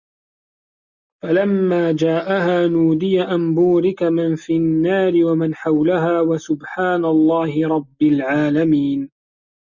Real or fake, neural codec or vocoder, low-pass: real; none; 7.2 kHz